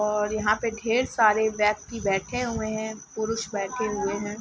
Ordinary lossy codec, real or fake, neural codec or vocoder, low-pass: none; real; none; none